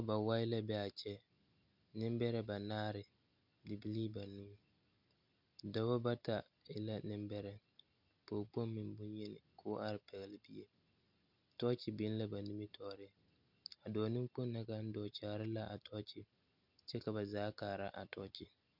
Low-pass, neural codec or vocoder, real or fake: 5.4 kHz; none; real